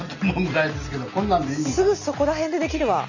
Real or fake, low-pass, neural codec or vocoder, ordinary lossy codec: real; 7.2 kHz; none; none